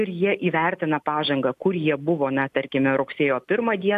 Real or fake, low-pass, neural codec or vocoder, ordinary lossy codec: real; 14.4 kHz; none; AAC, 96 kbps